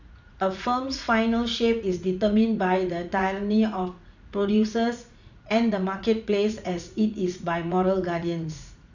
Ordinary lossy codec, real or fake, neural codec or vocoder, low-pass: none; fake; vocoder, 44.1 kHz, 80 mel bands, Vocos; 7.2 kHz